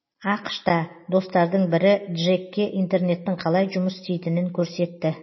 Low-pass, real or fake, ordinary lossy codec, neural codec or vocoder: 7.2 kHz; real; MP3, 24 kbps; none